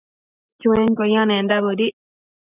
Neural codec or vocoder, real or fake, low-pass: none; real; 3.6 kHz